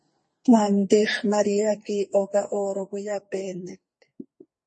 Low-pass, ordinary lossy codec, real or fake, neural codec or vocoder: 10.8 kHz; MP3, 32 kbps; fake; codec, 44.1 kHz, 2.6 kbps, SNAC